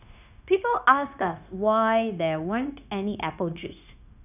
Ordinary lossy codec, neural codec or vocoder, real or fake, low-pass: none; codec, 16 kHz, 0.9 kbps, LongCat-Audio-Codec; fake; 3.6 kHz